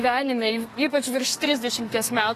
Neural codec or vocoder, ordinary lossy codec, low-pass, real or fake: codec, 32 kHz, 1.9 kbps, SNAC; AAC, 48 kbps; 14.4 kHz; fake